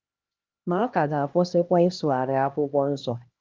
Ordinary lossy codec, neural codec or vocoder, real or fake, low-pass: Opus, 32 kbps; codec, 16 kHz, 1 kbps, X-Codec, HuBERT features, trained on LibriSpeech; fake; 7.2 kHz